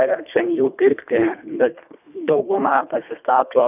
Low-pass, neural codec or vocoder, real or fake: 3.6 kHz; codec, 24 kHz, 1.5 kbps, HILCodec; fake